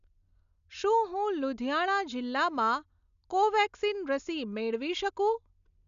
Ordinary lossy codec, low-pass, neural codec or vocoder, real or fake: none; 7.2 kHz; none; real